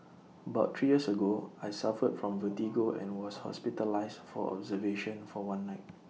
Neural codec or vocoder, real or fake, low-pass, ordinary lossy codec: none; real; none; none